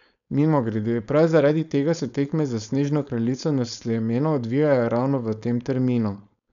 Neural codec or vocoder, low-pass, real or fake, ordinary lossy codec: codec, 16 kHz, 4.8 kbps, FACodec; 7.2 kHz; fake; none